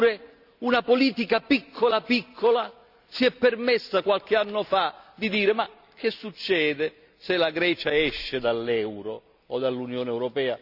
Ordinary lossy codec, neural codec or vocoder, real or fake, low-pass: none; none; real; 5.4 kHz